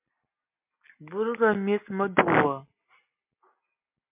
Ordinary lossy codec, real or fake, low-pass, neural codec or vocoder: MP3, 32 kbps; real; 3.6 kHz; none